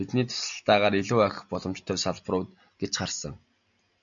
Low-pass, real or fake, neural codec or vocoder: 7.2 kHz; real; none